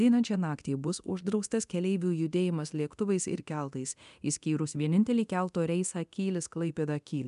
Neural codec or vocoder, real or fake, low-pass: codec, 24 kHz, 0.9 kbps, DualCodec; fake; 10.8 kHz